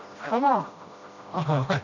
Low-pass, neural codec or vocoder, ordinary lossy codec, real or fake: 7.2 kHz; codec, 16 kHz, 1 kbps, FreqCodec, smaller model; none; fake